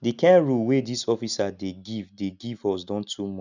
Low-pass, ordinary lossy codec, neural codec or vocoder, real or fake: 7.2 kHz; none; none; real